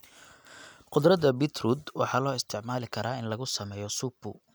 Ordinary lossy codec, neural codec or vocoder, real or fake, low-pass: none; none; real; none